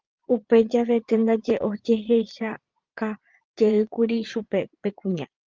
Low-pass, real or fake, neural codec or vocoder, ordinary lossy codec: 7.2 kHz; fake; vocoder, 22.05 kHz, 80 mel bands, Vocos; Opus, 32 kbps